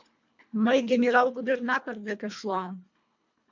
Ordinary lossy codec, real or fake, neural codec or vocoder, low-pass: MP3, 64 kbps; fake; codec, 24 kHz, 1.5 kbps, HILCodec; 7.2 kHz